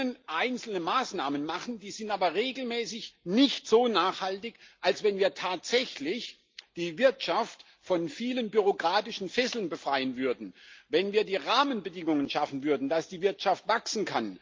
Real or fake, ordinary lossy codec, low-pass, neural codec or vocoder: real; Opus, 24 kbps; 7.2 kHz; none